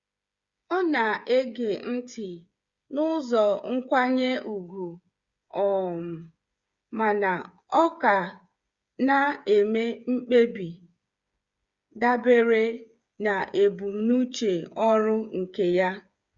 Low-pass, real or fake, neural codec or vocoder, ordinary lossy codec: 7.2 kHz; fake; codec, 16 kHz, 8 kbps, FreqCodec, smaller model; Opus, 64 kbps